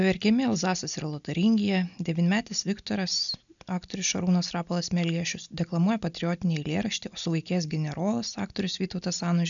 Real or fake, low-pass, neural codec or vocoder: real; 7.2 kHz; none